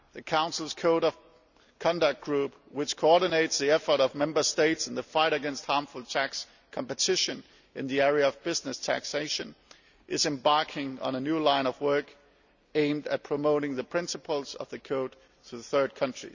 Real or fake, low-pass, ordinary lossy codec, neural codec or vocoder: real; 7.2 kHz; none; none